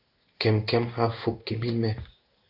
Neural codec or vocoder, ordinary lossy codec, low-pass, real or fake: codec, 16 kHz in and 24 kHz out, 1 kbps, XY-Tokenizer; AAC, 48 kbps; 5.4 kHz; fake